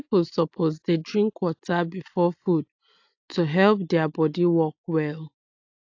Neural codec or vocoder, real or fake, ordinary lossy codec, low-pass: none; real; AAC, 48 kbps; 7.2 kHz